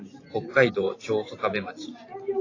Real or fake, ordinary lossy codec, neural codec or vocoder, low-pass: fake; AAC, 32 kbps; vocoder, 44.1 kHz, 128 mel bands every 512 samples, BigVGAN v2; 7.2 kHz